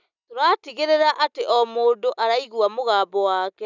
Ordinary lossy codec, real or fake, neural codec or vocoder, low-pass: none; real; none; 7.2 kHz